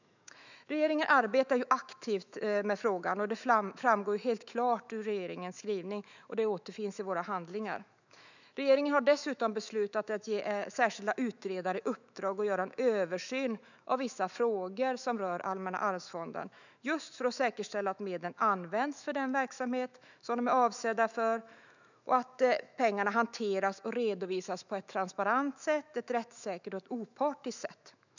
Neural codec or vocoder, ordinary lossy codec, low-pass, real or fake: autoencoder, 48 kHz, 128 numbers a frame, DAC-VAE, trained on Japanese speech; none; 7.2 kHz; fake